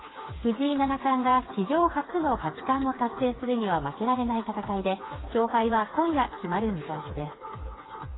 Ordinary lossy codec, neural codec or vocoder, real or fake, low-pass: AAC, 16 kbps; codec, 16 kHz, 4 kbps, FreqCodec, smaller model; fake; 7.2 kHz